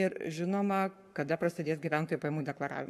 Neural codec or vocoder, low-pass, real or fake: codec, 44.1 kHz, 7.8 kbps, Pupu-Codec; 14.4 kHz; fake